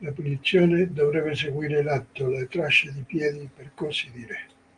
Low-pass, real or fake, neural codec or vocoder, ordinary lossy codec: 9.9 kHz; real; none; Opus, 24 kbps